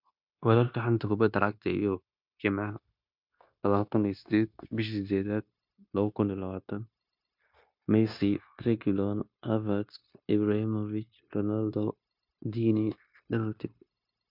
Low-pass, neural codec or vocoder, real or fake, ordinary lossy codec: 5.4 kHz; codec, 16 kHz, 0.9 kbps, LongCat-Audio-Codec; fake; MP3, 48 kbps